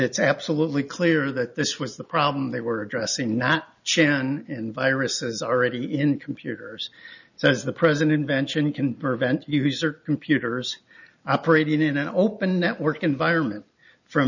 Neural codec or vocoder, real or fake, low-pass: none; real; 7.2 kHz